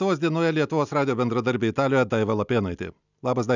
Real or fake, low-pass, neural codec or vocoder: real; 7.2 kHz; none